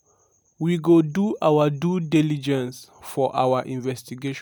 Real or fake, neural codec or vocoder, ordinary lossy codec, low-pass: real; none; none; none